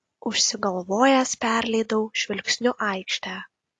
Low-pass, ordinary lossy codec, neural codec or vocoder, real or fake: 10.8 kHz; AAC, 48 kbps; none; real